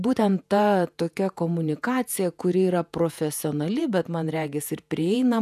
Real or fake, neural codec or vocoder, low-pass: fake; vocoder, 48 kHz, 128 mel bands, Vocos; 14.4 kHz